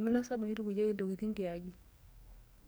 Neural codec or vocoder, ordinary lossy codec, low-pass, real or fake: codec, 44.1 kHz, 2.6 kbps, SNAC; none; none; fake